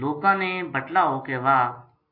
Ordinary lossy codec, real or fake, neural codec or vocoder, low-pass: MP3, 32 kbps; real; none; 5.4 kHz